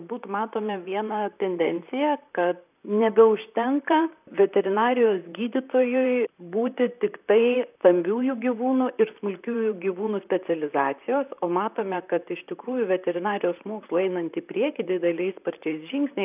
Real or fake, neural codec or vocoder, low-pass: fake; vocoder, 44.1 kHz, 128 mel bands, Pupu-Vocoder; 3.6 kHz